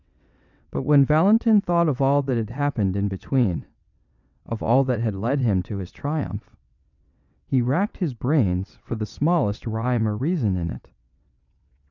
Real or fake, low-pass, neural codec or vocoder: fake; 7.2 kHz; vocoder, 22.05 kHz, 80 mel bands, WaveNeXt